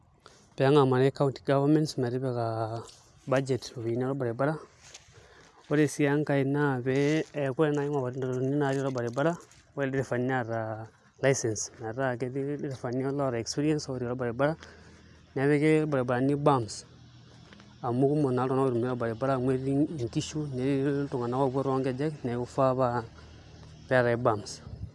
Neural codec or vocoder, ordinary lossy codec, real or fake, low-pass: none; none; real; none